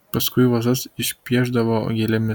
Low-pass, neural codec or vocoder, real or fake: 19.8 kHz; none; real